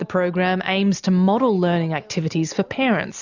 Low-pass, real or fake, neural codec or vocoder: 7.2 kHz; real; none